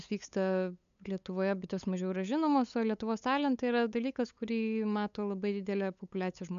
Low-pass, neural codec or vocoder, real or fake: 7.2 kHz; codec, 16 kHz, 8 kbps, FunCodec, trained on LibriTTS, 25 frames a second; fake